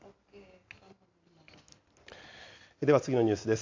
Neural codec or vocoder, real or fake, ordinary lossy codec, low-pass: none; real; AAC, 48 kbps; 7.2 kHz